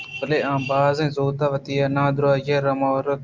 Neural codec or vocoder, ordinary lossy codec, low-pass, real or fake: none; Opus, 24 kbps; 7.2 kHz; real